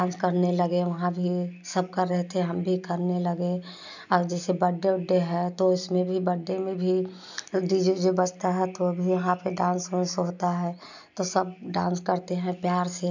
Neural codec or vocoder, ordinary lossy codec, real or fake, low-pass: none; none; real; 7.2 kHz